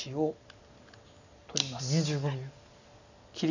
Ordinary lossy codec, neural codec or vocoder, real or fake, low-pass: none; none; real; 7.2 kHz